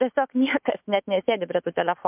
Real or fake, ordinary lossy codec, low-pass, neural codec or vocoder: fake; MP3, 32 kbps; 3.6 kHz; autoencoder, 48 kHz, 128 numbers a frame, DAC-VAE, trained on Japanese speech